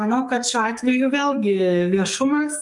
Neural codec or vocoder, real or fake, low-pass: codec, 44.1 kHz, 2.6 kbps, SNAC; fake; 10.8 kHz